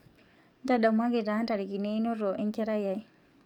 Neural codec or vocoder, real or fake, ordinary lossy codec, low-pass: codec, 44.1 kHz, 7.8 kbps, DAC; fake; none; 19.8 kHz